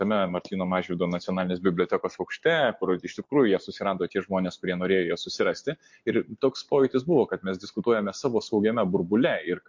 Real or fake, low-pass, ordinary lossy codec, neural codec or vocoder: real; 7.2 kHz; MP3, 48 kbps; none